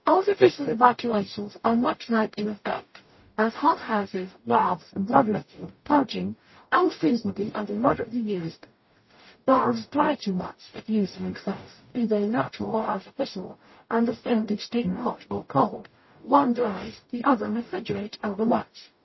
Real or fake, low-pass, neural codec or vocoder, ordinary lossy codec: fake; 7.2 kHz; codec, 44.1 kHz, 0.9 kbps, DAC; MP3, 24 kbps